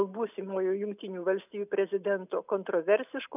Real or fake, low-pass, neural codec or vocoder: real; 3.6 kHz; none